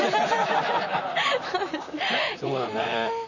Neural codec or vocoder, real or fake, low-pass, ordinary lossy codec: vocoder, 44.1 kHz, 128 mel bands, Pupu-Vocoder; fake; 7.2 kHz; none